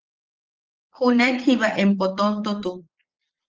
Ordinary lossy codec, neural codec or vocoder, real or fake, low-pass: Opus, 16 kbps; vocoder, 22.05 kHz, 80 mel bands, Vocos; fake; 7.2 kHz